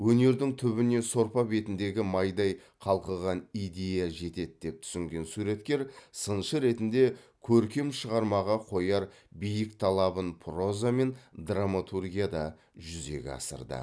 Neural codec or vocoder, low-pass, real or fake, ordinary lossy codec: none; none; real; none